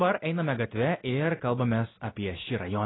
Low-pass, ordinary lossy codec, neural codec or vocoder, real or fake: 7.2 kHz; AAC, 16 kbps; none; real